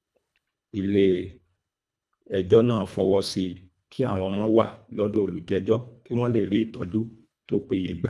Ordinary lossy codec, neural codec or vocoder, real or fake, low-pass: none; codec, 24 kHz, 1.5 kbps, HILCodec; fake; none